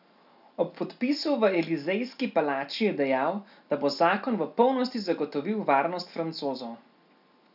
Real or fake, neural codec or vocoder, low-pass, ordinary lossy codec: real; none; 5.4 kHz; none